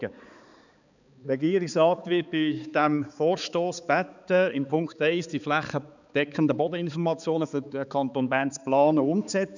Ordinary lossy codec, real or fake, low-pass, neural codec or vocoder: none; fake; 7.2 kHz; codec, 16 kHz, 4 kbps, X-Codec, HuBERT features, trained on balanced general audio